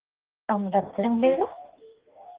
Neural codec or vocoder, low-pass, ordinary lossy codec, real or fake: codec, 44.1 kHz, 2.6 kbps, DAC; 3.6 kHz; Opus, 16 kbps; fake